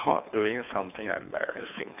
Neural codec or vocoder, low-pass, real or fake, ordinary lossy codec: codec, 24 kHz, 3 kbps, HILCodec; 3.6 kHz; fake; none